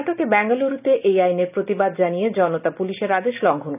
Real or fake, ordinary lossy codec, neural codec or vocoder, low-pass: real; none; none; 3.6 kHz